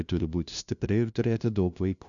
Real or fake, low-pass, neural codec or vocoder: fake; 7.2 kHz; codec, 16 kHz, 0.5 kbps, FunCodec, trained on LibriTTS, 25 frames a second